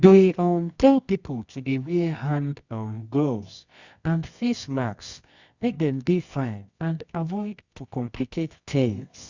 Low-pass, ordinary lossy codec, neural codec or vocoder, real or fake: 7.2 kHz; Opus, 64 kbps; codec, 24 kHz, 0.9 kbps, WavTokenizer, medium music audio release; fake